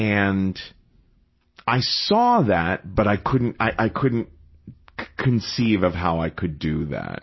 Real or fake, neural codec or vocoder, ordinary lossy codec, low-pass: real; none; MP3, 24 kbps; 7.2 kHz